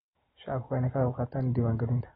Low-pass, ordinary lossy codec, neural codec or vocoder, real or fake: 19.8 kHz; AAC, 16 kbps; none; real